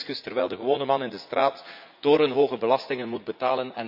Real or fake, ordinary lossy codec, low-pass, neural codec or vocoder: fake; MP3, 48 kbps; 5.4 kHz; vocoder, 44.1 kHz, 80 mel bands, Vocos